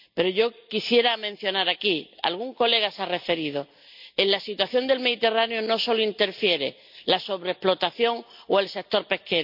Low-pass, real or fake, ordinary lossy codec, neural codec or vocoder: 5.4 kHz; real; none; none